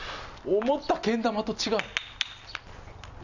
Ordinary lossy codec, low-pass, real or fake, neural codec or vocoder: none; 7.2 kHz; real; none